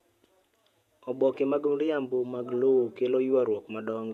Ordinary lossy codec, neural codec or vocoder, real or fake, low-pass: none; vocoder, 48 kHz, 128 mel bands, Vocos; fake; 14.4 kHz